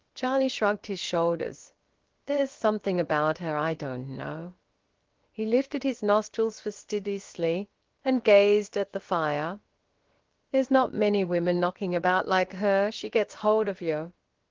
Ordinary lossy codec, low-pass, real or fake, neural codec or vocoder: Opus, 16 kbps; 7.2 kHz; fake; codec, 16 kHz, about 1 kbps, DyCAST, with the encoder's durations